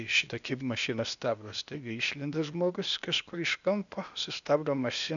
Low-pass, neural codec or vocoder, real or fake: 7.2 kHz; codec, 16 kHz, 0.8 kbps, ZipCodec; fake